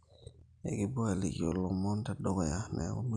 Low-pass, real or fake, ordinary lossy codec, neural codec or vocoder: 9.9 kHz; real; none; none